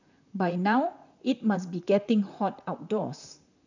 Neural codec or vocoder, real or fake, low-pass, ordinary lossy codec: vocoder, 44.1 kHz, 128 mel bands, Pupu-Vocoder; fake; 7.2 kHz; none